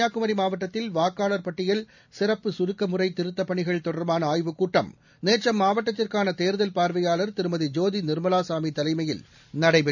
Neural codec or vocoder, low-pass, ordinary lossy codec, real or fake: none; 7.2 kHz; none; real